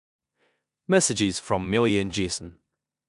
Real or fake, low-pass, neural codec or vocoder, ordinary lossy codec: fake; 10.8 kHz; codec, 16 kHz in and 24 kHz out, 0.9 kbps, LongCat-Audio-Codec, four codebook decoder; none